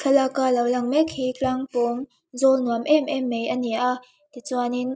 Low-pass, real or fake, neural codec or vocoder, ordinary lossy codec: none; real; none; none